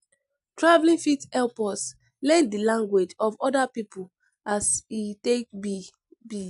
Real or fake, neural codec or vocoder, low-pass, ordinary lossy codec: real; none; 10.8 kHz; none